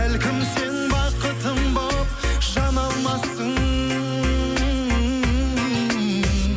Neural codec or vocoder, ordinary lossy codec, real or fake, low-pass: none; none; real; none